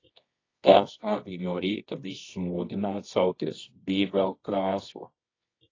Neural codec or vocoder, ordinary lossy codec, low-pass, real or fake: codec, 24 kHz, 0.9 kbps, WavTokenizer, medium music audio release; AAC, 32 kbps; 7.2 kHz; fake